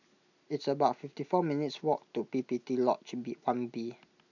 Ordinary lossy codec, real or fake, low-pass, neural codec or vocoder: none; real; 7.2 kHz; none